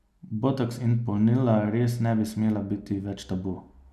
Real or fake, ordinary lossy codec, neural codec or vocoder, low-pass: real; none; none; 14.4 kHz